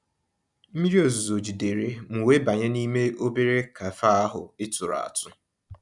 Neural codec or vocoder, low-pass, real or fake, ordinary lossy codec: none; 10.8 kHz; real; none